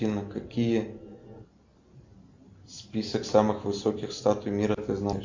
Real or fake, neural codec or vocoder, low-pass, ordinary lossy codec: real; none; 7.2 kHz; MP3, 64 kbps